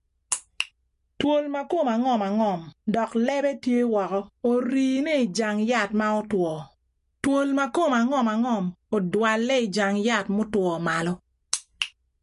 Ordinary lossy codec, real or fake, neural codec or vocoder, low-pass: MP3, 48 kbps; real; none; 14.4 kHz